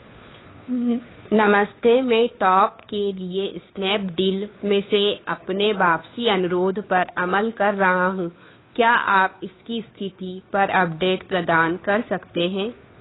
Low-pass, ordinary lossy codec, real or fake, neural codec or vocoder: 7.2 kHz; AAC, 16 kbps; fake; codec, 16 kHz, 2 kbps, FunCodec, trained on Chinese and English, 25 frames a second